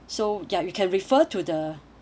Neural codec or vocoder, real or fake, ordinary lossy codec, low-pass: none; real; none; none